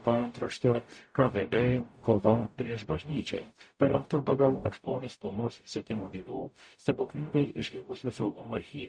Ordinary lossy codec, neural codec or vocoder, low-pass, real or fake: MP3, 48 kbps; codec, 44.1 kHz, 0.9 kbps, DAC; 9.9 kHz; fake